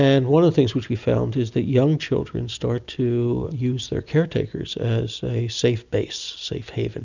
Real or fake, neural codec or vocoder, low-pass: real; none; 7.2 kHz